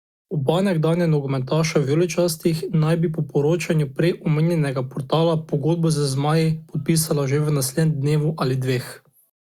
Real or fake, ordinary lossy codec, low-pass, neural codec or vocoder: real; Opus, 64 kbps; 19.8 kHz; none